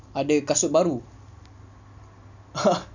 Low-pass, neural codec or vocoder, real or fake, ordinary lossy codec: 7.2 kHz; none; real; none